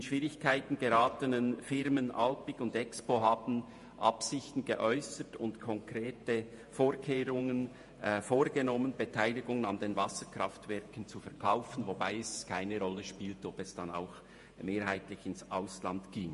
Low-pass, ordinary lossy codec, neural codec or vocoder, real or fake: 14.4 kHz; MP3, 48 kbps; vocoder, 48 kHz, 128 mel bands, Vocos; fake